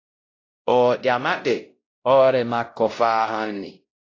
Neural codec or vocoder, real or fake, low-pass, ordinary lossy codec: codec, 16 kHz, 0.5 kbps, X-Codec, WavLM features, trained on Multilingual LibriSpeech; fake; 7.2 kHz; AAC, 32 kbps